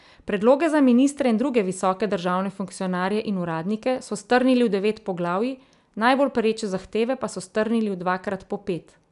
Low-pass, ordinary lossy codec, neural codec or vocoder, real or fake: 10.8 kHz; none; none; real